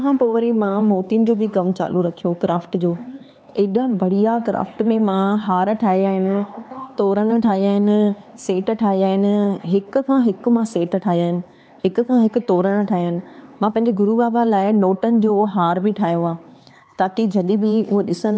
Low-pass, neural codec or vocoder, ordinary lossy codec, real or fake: none; codec, 16 kHz, 4 kbps, X-Codec, HuBERT features, trained on LibriSpeech; none; fake